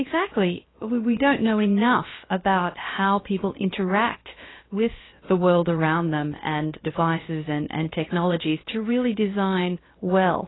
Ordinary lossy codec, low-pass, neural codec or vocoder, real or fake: AAC, 16 kbps; 7.2 kHz; codec, 16 kHz, about 1 kbps, DyCAST, with the encoder's durations; fake